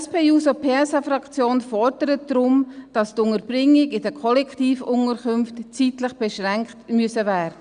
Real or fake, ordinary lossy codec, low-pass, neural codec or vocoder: real; Opus, 64 kbps; 9.9 kHz; none